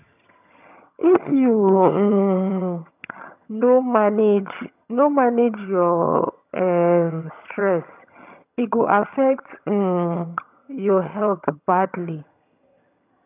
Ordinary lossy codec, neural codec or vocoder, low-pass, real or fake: none; vocoder, 22.05 kHz, 80 mel bands, HiFi-GAN; 3.6 kHz; fake